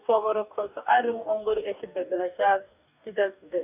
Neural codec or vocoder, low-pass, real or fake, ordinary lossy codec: codec, 44.1 kHz, 2.6 kbps, DAC; 3.6 kHz; fake; none